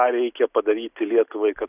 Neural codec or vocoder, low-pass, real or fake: none; 3.6 kHz; real